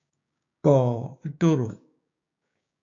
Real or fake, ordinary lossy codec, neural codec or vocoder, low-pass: fake; AAC, 64 kbps; codec, 16 kHz, 6 kbps, DAC; 7.2 kHz